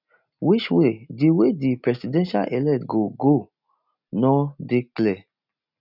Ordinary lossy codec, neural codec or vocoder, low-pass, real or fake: none; none; 5.4 kHz; real